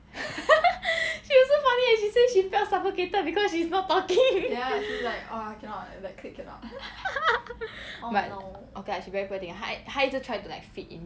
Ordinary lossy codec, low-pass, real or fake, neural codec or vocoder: none; none; real; none